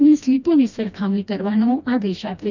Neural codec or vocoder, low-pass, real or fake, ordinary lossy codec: codec, 16 kHz, 1 kbps, FreqCodec, smaller model; 7.2 kHz; fake; none